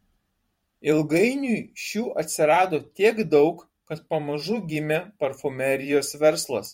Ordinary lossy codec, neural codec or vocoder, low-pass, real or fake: MP3, 64 kbps; vocoder, 44.1 kHz, 128 mel bands every 512 samples, BigVGAN v2; 19.8 kHz; fake